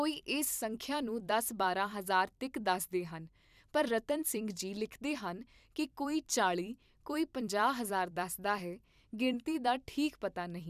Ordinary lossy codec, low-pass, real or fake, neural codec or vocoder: none; 14.4 kHz; fake; vocoder, 44.1 kHz, 128 mel bands every 256 samples, BigVGAN v2